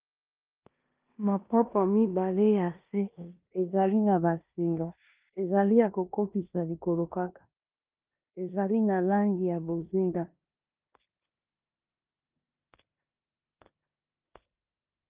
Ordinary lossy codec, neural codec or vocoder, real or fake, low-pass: Opus, 32 kbps; codec, 16 kHz in and 24 kHz out, 0.9 kbps, LongCat-Audio-Codec, four codebook decoder; fake; 3.6 kHz